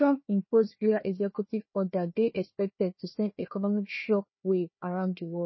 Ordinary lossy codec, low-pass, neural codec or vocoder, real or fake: MP3, 24 kbps; 7.2 kHz; codec, 16 kHz, 1 kbps, FunCodec, trained on Chinese and English, 50 frames a second; fake